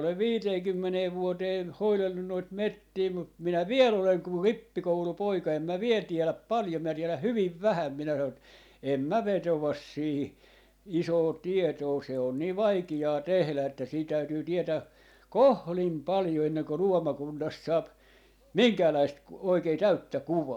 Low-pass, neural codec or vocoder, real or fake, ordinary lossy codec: 19.8 kHz; none; real; none